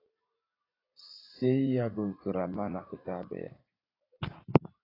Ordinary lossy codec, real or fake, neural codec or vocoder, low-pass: AAC, 24 kbps; fake; vocoder, 44.1 kHz, 80 mel bands, Vocos; 5.4 kHz